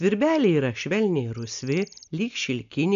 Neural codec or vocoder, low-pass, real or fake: none; 7.2 kHz; real